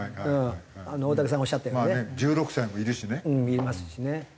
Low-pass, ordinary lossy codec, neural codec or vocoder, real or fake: none; none; none; real